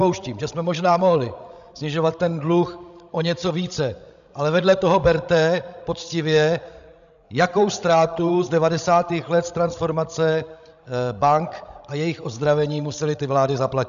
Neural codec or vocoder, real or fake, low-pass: codec, 16 kHz, 16 kbps, FreqCodec, larger model; fake; 7.2 kHz